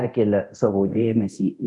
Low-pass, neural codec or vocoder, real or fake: 10.8 kHz; codec, 24 kHz, 0.9 kbps, DualCodec; fake